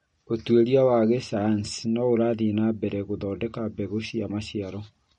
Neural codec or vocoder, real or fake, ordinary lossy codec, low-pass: none; real; MP3, 48 kbps; 10.8 kHz